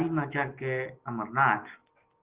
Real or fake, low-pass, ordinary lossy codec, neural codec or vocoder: real; 3.6 kHz; Opus, 16 kbps; none